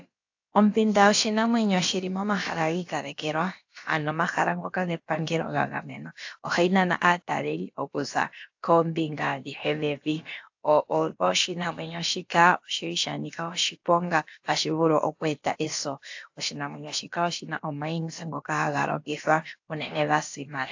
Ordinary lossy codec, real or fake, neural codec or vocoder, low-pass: AAC, 48 kbps; fake; codec, 16 kHz, about 1 kbps, DyCAST, with the encoder's durations; 7.2 kHz